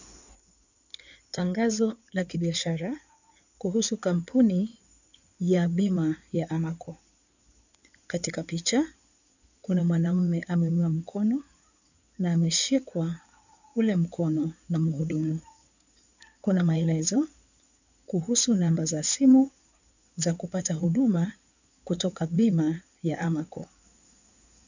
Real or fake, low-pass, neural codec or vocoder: fake; 7.2 kHz; codec, 16 kHz in and 24 kHz out, 2.2 kbps, FireRedTTS-2 codec